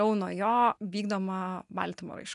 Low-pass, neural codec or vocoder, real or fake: 10.8 kHz; none; real